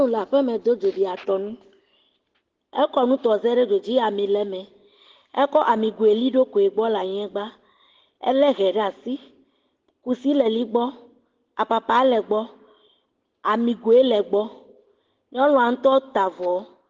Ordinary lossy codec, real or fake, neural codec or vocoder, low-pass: Opus, 16 kbps; real; none; 7.2 kHz